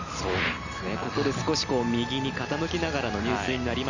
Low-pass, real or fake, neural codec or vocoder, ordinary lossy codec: 7.2 kHz; real; none; none